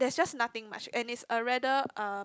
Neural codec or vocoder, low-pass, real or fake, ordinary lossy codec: none; none; real; none